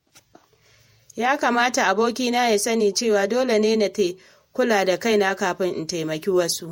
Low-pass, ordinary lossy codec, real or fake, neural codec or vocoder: 19.8 kHz; MP3, 64 kbps; fake; vocoder, 48 kHz, 128 mel bands, Vocos